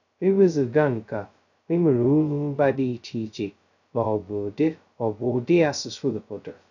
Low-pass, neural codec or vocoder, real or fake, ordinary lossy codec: 7.2 kHz; codec, 16 kHz, 0.2 kbps, FocalCodec; fake; none